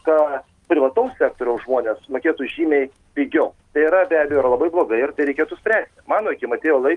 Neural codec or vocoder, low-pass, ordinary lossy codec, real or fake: none; 10.8 kHz; Opus, 24 kbps; real